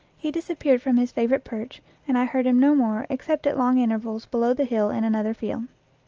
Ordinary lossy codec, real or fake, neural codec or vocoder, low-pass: Opus, 24 kbps; real; none; 7.2 kHz